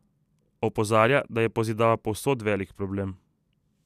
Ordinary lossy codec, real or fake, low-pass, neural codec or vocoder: none; real; 14.4 kHz; none